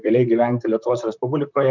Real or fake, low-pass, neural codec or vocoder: fake; 7.2 kHz; vocoder, 44.1 kHz, 128 mel bands, Pupu-Vocoder